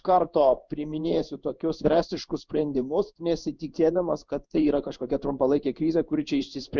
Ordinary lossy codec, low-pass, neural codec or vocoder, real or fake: Opus, 64 kbps; 7.2 kHz; codec, 16 kHz in and 24 kHz out, 1 kbps, XY-Tokenizer; fake